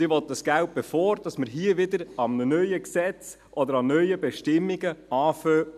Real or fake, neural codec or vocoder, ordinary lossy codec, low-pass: real; none; none; 14.4 kHz